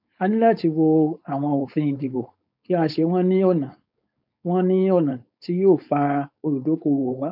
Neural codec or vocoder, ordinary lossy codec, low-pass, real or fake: codec, 16 kHz, 4.8 kbps, FACodec; none; 5.4 kHz; fake